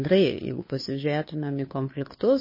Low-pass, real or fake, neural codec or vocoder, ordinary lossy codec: 5.4 kHz; fake; codec, 16 kHz, 2 kbps, FunCodec, trained on Chinese and English, 25 frames a second; MP3, 24 kbps